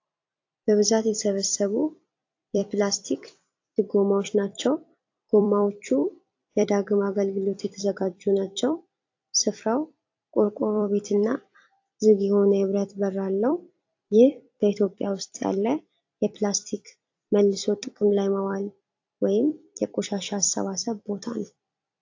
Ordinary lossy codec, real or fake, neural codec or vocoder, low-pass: AAC, 48 kbps; fake; vocoder, 44.1 kHz, 128 mel bands every 256 samples, BigVGAN v2; 7.2 kHz